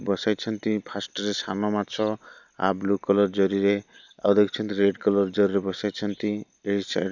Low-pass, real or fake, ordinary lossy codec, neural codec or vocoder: 7.2 kHz; real; none; none